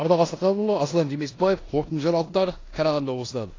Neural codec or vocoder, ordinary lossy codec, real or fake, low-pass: codec, 16 kHz in and 24 kHz out, 0.9 kbps, LongCat-Audio-Codec, four codebook decoder; AAC, 32 kbps; fake; 7.2 kHz